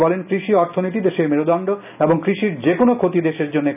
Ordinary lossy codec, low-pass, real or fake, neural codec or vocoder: none; 3.6 kHz; real; none